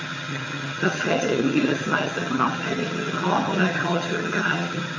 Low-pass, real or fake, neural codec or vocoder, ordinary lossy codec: 7.2 kHz; fake; vocoder, 22.05 kHz, 80 mel bands, HiFi-GAN; MP3, 32 kbps